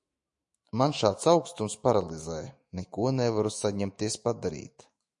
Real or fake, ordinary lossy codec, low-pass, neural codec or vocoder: fake; MP3, 48 kbps; 10.8 kHz; autoencoder, 48 kHz, 128 numbers a frame, DAC-VAE, trained on Japanese speech